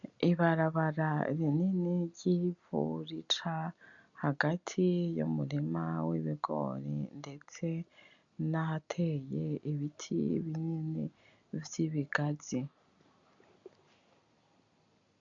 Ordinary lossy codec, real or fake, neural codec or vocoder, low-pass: MP3, 96 kbps; real; none; 7.2 kHz